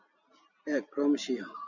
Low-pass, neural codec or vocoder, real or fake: 7.2 kHz; none; real